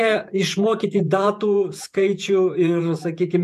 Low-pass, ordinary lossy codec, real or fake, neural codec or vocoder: 14.4 kHz; MP3, 96 kbps; fake; vocoder, 48 kHz, 128 mel bands, Vocos